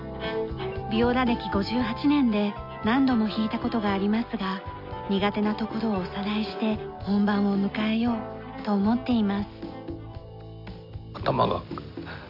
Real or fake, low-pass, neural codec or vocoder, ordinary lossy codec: real; 5.4 kHz; none; none